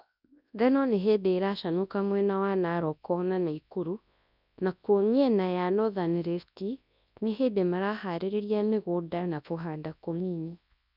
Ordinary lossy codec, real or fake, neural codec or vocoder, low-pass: none; fake; codec, 24 kHz, 0.9 kbps, WavTokenizer, large speech release; 5.4 kHz